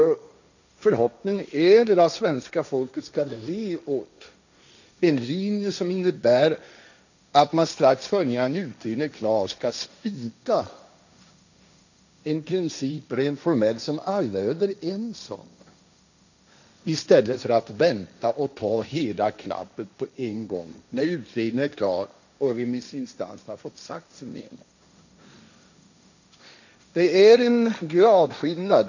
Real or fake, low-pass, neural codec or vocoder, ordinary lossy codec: fake; 7.2 kHz; codec, 16 kHz, 1.1 kbps, Voila-Tokenizer; none